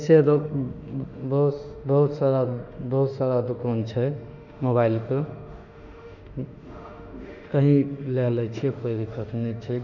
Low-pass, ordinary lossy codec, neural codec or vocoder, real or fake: 7.2 kHz; none; autoencoder, 48 kHz, 32 numbers a frame, DAC-VAE, trained on Japanese speech; fake